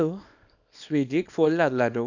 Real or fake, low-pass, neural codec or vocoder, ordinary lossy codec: fake; 7.2 kHz; codec, 24 kHz, 0.9 kbps, WavTokenizer, small release; none